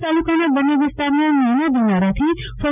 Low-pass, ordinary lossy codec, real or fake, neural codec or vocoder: 3.6 kHz; none; real; none